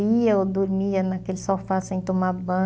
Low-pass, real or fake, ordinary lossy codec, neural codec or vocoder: none; real; none; none